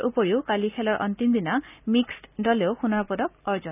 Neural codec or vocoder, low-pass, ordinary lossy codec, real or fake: none; 3.6 kHz; none; real